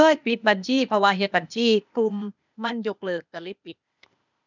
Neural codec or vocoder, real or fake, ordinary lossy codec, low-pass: codec, 16 kHz, 0.8 kbps, ZipCodec; fake; none; 7.2 kHz